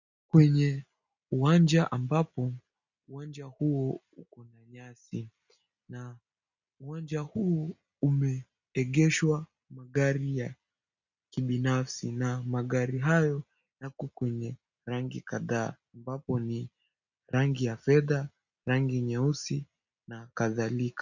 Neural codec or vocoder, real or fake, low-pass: none; real; 7.2 kHz